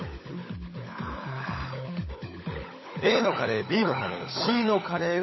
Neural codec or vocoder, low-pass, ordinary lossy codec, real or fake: codec, 16 kHz, 16 kbps, FunCodec, trained on LibriTTS, 50 frames a second; 7.2 kHz; MP3, 24 kbps; fake